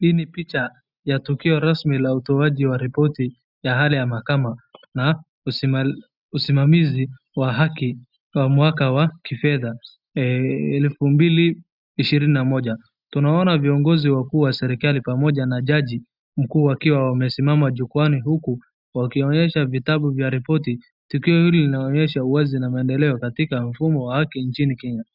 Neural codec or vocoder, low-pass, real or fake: none; 5.4 kHz; real